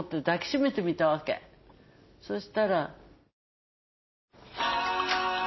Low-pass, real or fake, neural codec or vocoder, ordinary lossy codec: 7.2 kHz; real; none; MP3, 24 kbps